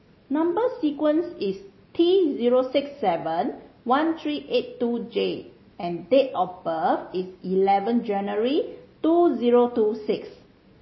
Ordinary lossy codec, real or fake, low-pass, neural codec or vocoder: MP3, 24 kbps; real; 7.2 kHz; none